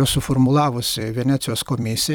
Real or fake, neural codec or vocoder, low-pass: fake; vocoder, 44.1 kHz, 128 mel bands every 256 samples, BigVGAN v2; 19.8 kHz